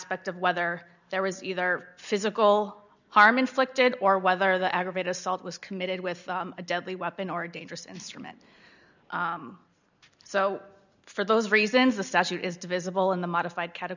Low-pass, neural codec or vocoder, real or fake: 7.2 kHz; none; real